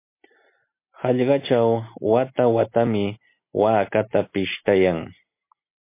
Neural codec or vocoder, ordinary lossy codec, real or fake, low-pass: none; MP3, 24 kbps; real; 3.6 kHz